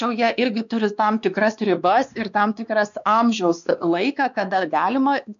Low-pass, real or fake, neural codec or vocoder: 7.2 kHz; fake; codec, 16 kHz, 2 kbps, X-Codec, WavLM features, trained on Multilingual LibriSpeech